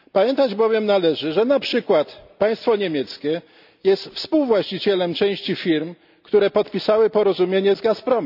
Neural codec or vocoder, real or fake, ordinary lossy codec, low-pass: none; real; none; 5.4 kHz